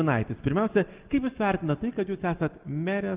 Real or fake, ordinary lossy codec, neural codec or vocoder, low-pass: real; Opus, 24 kbps; none; 3.6 kHz